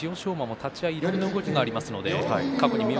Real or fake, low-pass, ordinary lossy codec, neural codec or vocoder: real; none; none; none